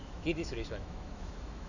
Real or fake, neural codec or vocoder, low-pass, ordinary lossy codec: fake; autoencoder, 48 kHz, 128 numbers a frame, DAC-VAE, trained on Japanese speech; 7.2 kHz; none